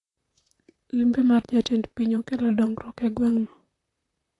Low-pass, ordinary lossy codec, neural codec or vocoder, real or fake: 10.8 kHz; AAC, 48 kbps; vocoder, 44.1 kHz, 128 mel bands, Pupu-Vocoder; fake